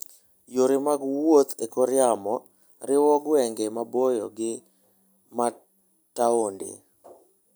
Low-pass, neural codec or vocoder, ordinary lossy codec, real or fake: none; none; none; real